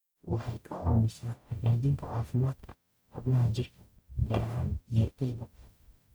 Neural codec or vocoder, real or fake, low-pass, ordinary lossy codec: codec, 44.1 kHz, 0.9 kbps, DAC; fake; none; none